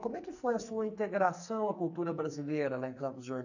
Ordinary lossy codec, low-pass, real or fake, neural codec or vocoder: none; 7.2 kHz; fake; codec, 44.1 kHz, 2.6 kbps, SNAC